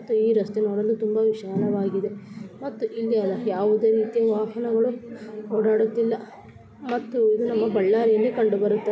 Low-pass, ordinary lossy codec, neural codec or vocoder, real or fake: none; none; none; real